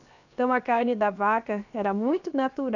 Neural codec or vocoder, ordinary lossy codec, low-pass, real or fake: codec, 16 kHz, 0.7 kbps, FocalCodec; none; 7.2 kHz; fake